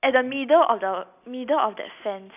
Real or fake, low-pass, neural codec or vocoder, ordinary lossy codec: real; 3.6 kHz; none; none